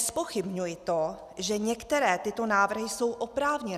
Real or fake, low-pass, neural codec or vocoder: real; 14.4 kHz; none